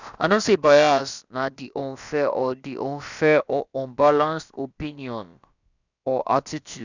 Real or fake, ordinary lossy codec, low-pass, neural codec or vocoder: fake; none; 7.2 kHz; codec, 16 kHz, about 1 kbps, DyCAST, with the encoder's durations